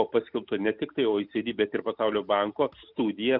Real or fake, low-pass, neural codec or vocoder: real; 5.4 kHz; none